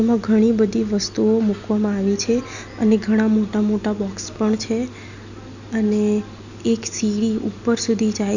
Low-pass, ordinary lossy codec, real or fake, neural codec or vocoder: 7.2 kHz; none; real; none